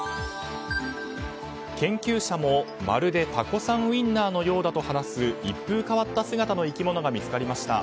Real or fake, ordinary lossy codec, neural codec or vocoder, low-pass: real; none; none; none